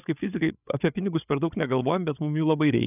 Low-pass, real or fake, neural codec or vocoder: 3.6 kHz; fake; codec, 16 kHz, 8 kbps, FreqCodec, larger model